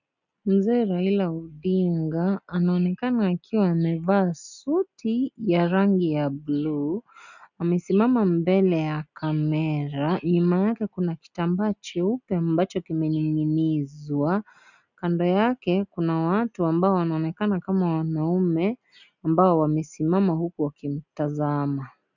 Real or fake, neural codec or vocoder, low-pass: real; none; 7.2 kHz